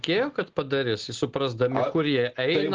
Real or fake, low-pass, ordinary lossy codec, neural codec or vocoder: real; 7.2 kHz; Opus, 16 kbps; none